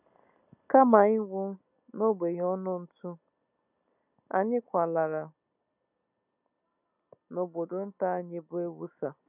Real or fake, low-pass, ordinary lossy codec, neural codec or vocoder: real; 3.6 kHz; none; none